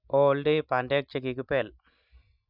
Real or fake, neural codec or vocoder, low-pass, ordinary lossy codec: real; none; 5.4 kHz; none